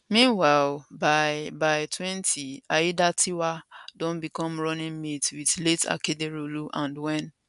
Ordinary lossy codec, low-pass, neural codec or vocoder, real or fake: none; 10.8 kHz; none; real